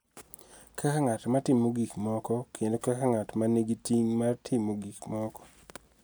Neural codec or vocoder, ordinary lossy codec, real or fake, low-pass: none; none; real; none